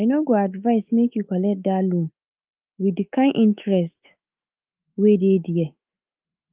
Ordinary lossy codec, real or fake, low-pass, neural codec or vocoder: Opus, 24 kbps; fake; 3.6 kHz; autoencoder, 48 kHz, 128 numbers a frame, DAC-VAE, trained on Japanese speech